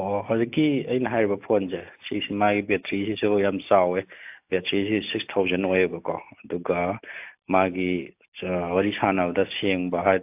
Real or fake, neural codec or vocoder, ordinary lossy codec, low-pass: real; none; AAC, 32 kbps; 3.6 kHz